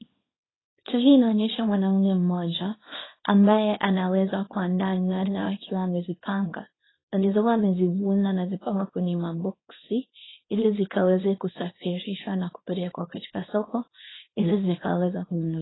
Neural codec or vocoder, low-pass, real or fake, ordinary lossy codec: codec, 24 kHz, 0.9 kbps, WavTokenizer, small release; 7.2 kHz; fake; AAC, 16 kbps